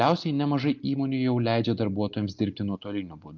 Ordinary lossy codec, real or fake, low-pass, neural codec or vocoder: Opus, 24 kbps; real; 7.2 kHz; none